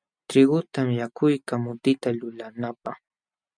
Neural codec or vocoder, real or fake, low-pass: none; real; 9.9 kHz